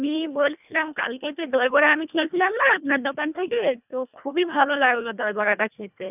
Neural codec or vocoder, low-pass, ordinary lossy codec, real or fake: codec, 24 kHz, 1.5 kbps, HILCodec; 3.6 kHz; none; fake